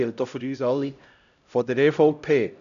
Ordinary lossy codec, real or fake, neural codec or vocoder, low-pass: none; fake; codec, 16 kHz, 0.5 kbps, X-Codec, HuBERT features, trained on LibriSpeech; 7.2 kHz